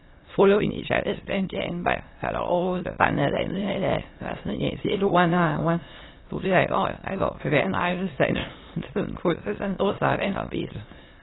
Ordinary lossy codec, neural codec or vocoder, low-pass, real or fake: AAC, 16 kbps; autoencoder, 22.05 kHz, a latent of 192 numbers a frame, VITS, trained on many speakers; 7.2 kHz; fake